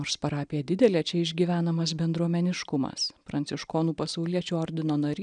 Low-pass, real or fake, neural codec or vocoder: 9.9 kHz; real; none